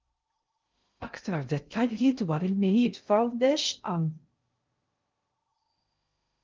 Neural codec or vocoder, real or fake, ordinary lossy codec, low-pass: codec, 16 kHz in and 24 kHz out, 0.6 kbps, FocalCodec, streaming, 2048 codes; fake; Opus, 32 kbps; 7.2 kHz